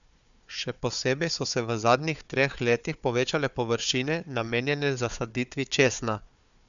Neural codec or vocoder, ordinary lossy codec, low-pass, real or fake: codec, 16 kHz, 4 kbps, FunCodec, trained on Chinese and English, 50 frames a second; none; 7.2 kHz; fake